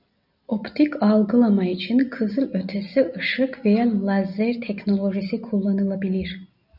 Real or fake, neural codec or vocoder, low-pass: real; none; 5.4 kHz